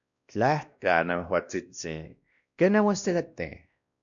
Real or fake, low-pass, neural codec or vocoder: fake; 7.2 kHz; codec, 16 kHz, 1 kbps, X-Codec, WavLM features, trained on Multilingual LibriSpeech